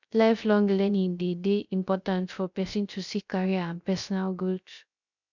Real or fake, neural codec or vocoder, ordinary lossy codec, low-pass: fake; codec, 16 kHz, 0.3 kbps, FocalCodec; none; 7.2 kHz